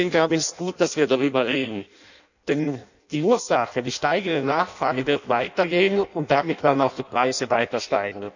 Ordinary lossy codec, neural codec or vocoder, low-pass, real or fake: none; codec, 16 kHz in and 24 kHz out, 0.6 kbps, FireRedTTS-2 codec; 7.2 kHz; fake